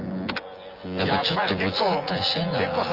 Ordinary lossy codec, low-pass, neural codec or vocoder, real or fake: Opus, 24 kbps; 5.4 kHz; vocoder, 24 kHz, 100 mel bands, Vocos; fake